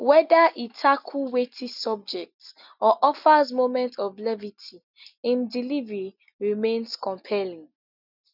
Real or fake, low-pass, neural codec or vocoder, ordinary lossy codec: real; 5.4 kHz; none; none